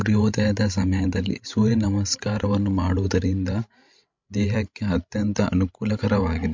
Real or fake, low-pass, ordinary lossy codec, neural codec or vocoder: fake; 7.2 kHz; MP3, 48 kbps; codec, 16 kHz, 16 kbps, FreqCodec, larger model